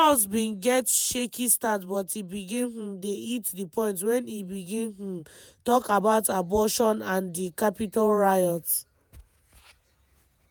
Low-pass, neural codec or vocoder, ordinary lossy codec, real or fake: none; vocoder, 48 kHz, 128 mel bands, Vocos; none; fake